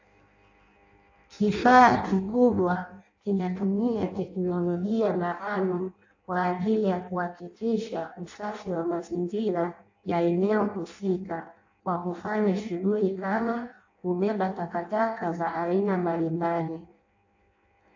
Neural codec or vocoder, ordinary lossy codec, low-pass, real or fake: codec, 16 kHz in and 24 kHz out, 0.6 kbps, FireRedTTS-2 codec; AAC, 48 kbps; 7.2 kHz; fake